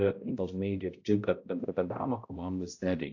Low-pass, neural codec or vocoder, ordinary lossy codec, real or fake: 7.2 kHz; codec, 16 kHz, 0.5 kbps, X-Codec, HuBERT features, trained on balanced general audio; AAC, 48 kbps; fake